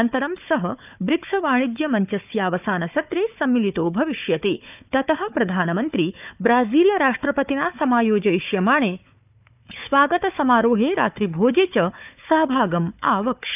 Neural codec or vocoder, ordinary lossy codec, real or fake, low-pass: codec, 16 kHz, 4 kbps, FunCodec, trained on Chinese and English, 50 frames a second; none; fake; 3.6 kHz